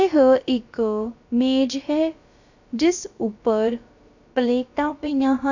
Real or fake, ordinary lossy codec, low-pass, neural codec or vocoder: fake; none; 7.2 kHz; codec, 16 kHz, about 1 kbps, DyCAST, with the encoder's durations